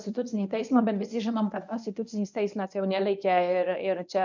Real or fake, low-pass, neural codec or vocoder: fake; 7.2 kHz; codec, 24 kHz, 0.9 kbps, WavTokenizer, medium speech release version 1